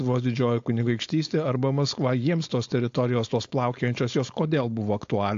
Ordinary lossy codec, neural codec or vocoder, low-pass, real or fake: AAC, 48 kbps; codec, 16 kHz, 4.8 kbps, FACodec; 7.2 kHz; fake